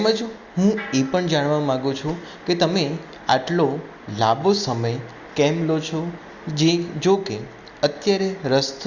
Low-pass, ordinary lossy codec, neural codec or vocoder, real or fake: 7.2 kHz; Opus, 64 kbps; none; real